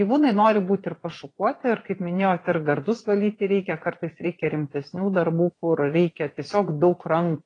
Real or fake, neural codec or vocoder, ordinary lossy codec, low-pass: fake; vocoder, 22.05 kHz, 80 mel bands, Vocos; AAC, 32 kbps; 9.9 kHz